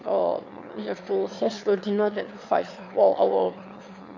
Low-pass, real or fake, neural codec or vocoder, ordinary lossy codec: 7.2 kHz; fake; autoencoder, 22.05 kHz, a latent of 192 numbers a frame, VITS, trained on one speaker; MP3, 48 kbps